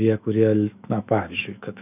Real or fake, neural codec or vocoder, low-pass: fake; autoencoder, 48 kHz, 128 numbers a frame, DAC-VAE, trained on Japanese speech; 3.6 kHz